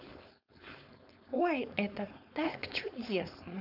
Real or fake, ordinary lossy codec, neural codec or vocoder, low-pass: fake; none; codec, 16 kHz, 4.8 kbps, FACodec; 5.4 kHz